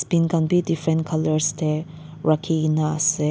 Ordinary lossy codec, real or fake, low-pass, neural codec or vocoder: none; real; none; none